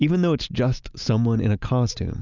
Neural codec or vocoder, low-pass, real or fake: none; 7.2 kHz; real